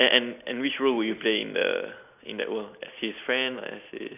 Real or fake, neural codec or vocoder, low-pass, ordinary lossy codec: real; none; 3.6 kHz; none